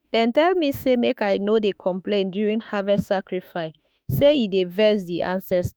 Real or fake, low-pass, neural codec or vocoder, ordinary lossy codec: fake; none; autoencoder, 48 kHz, 32 numbers a frame, DAC-VAE, trained on Japanese speech; none